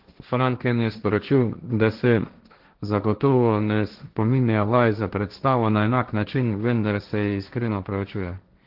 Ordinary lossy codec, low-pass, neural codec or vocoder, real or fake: Opus, 16 kbps; 5.4 kHz; codec, 16 kHz, 1.1 kbps, Voila-Tokenizer; fake